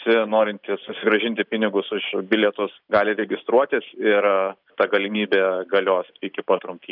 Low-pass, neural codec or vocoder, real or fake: 5.4 kHz; none; real